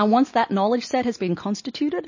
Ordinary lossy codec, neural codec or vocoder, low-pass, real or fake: MP3, 32 kbps; none; 7.2 kHz; real